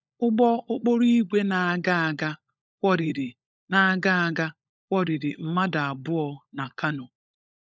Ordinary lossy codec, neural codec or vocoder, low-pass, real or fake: none; codec, 16 kHz, 16 kbps, FunCodec, trained on LibriTTS, 50 frames a second; none; fake